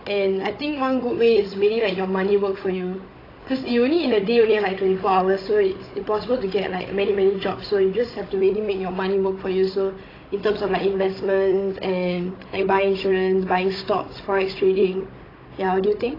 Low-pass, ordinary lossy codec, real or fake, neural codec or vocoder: 5.4 kHz; AAC, 24 kbps; fake; codec, 16 kHz, 16 kbps, FunCodec, trained on Chinese and English, 50 frames a second